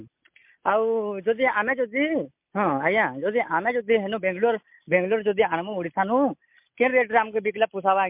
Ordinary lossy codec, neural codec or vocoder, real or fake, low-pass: MP3, 32 kbps; none; real; 3.6 kHz